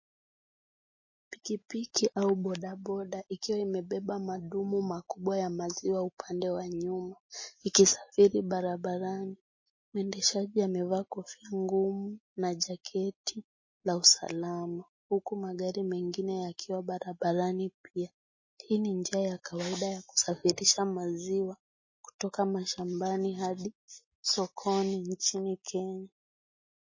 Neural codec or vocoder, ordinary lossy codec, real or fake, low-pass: none; MP3, 32 kbps; real; 7.2 kHz